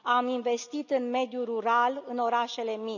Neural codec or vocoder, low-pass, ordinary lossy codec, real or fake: none; 7.2 kHz; none; real